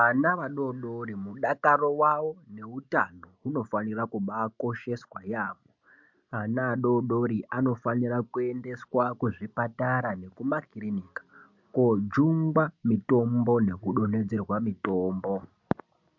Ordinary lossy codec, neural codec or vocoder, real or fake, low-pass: MP3, 64 kbps; none; real; 7.2 kHz